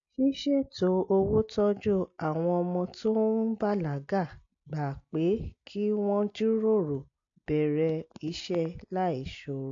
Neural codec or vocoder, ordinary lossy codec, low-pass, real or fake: none; AAC, 48 kbps; 7.2 kHz; real